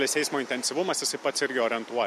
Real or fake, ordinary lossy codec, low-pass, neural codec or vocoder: real; MP3, 64 kbps; 14.4 kHz; none